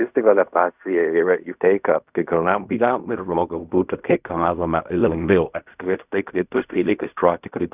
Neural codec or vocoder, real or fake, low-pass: codec, 16 kHz in and 24 kHz out, 0.4 kbps, LongCat-Audio-Codec, fine tuned four codebook decoder; fake; 3.6 kHz